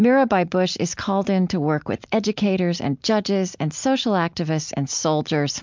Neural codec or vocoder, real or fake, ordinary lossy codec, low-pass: none; real; MP3, 64 kbps; 7.2 kHz